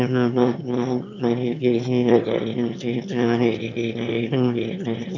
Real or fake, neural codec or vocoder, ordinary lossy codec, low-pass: fake; autoencoder, 22.05 kHz, a latent of 192 numbers a frame, VITS, trained on one speaker; none; 7.2 kHz